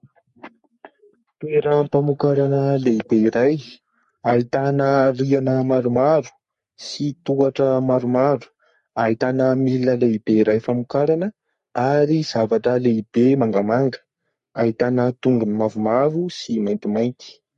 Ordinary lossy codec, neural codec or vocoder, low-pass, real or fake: MP3, 48 kbps; codec, 44.1 kHz, 3.4 kbps, Pupu-Codec; 14.4 kHz; fake